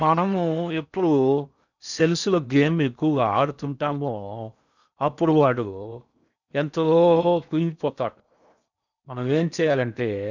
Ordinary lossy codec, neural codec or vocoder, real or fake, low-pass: none; codec, 16 kHz in and 24 kHz out, 0.6 kbps, FocalCodec, streaming, 4096 codes; fake; 7.2 kHz